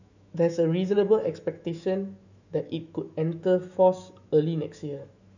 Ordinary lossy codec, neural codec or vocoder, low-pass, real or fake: none; autoencoder, 48 kHz, 128 numbers a frame, DAC-VAE, trained on Japanese speech; 7.2 kHz; fake